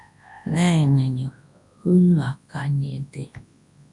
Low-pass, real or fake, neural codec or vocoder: 10.8 kHz; fake; codec, 24 kHz, 0.9 kbps, WavTokenizer, large speech release